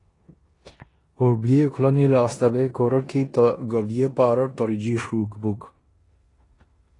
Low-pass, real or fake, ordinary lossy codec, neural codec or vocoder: 10.8 kHz; fake; AAC, 32 kbps; codec, 16 kHz in and 24 kHz out, 0.9 kbps, LongCat-Audio-Codec, four codebook decoder